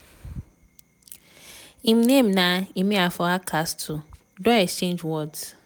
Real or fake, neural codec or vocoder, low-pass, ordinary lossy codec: real; none; none; none